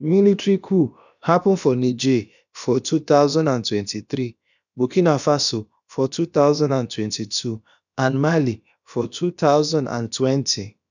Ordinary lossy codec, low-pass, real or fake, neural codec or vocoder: none; 7.2 kHz; fake; codec, 16 kHz, about 1 kbps, DyCAST, with the encoder's durations